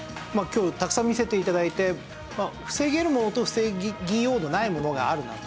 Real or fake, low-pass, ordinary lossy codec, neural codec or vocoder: real; none; none; none